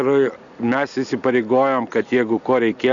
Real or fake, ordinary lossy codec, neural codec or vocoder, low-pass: real; MP3, 96 kbps; none; 7.2 kHz